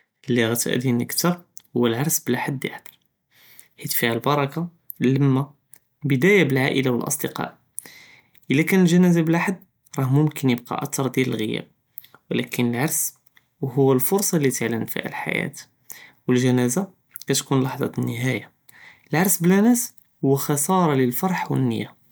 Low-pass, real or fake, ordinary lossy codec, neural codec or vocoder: none; real; none; none